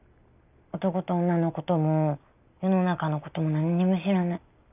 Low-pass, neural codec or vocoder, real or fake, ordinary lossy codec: 3.6 kHz; none; real; none